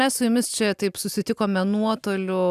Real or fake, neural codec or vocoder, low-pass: fake; vocoder, 44.1 kHz, 128 mel bands every 256 samples, BigVGAN v2; 14.4 kHz